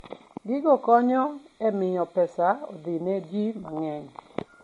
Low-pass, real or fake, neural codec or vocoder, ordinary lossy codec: 19.8 kHz; real; none; MP3, 48 kbps